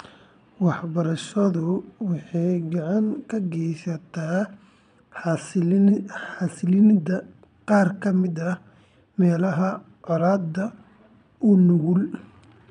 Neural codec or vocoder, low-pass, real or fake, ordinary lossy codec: vocoder, 22.05 kHz, 80 mel bands, Vocos; 9.9 kHz; fake; none